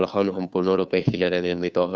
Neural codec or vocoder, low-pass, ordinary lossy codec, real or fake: codec, 16 kHz, 2 kbps, FunCodec, trained on Chinese and English, 25 frames a second; none; none; fake